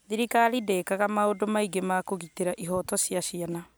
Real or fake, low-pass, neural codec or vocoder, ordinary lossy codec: real; none; none; none